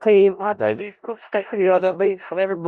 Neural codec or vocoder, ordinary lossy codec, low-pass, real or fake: codec, 16 kHz in and 24 kHz out, 0.4 kbps, LongCat-Audio-Codec, four codebook decoder; Opus, 64 kbps; 10.8 kHz; fake